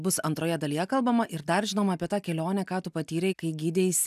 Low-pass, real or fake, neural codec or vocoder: 14.4 kHz; real; none